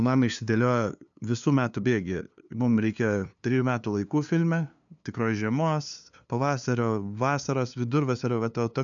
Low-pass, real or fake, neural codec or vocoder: 7.2 kHz; fake; codec, 16 kHz, 2 kbps, FunCodec, trained on LibriTTS, 25 frames a second